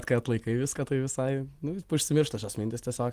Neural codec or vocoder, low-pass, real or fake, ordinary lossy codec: none; 14.4 kHz; real; Opus, 24 kbps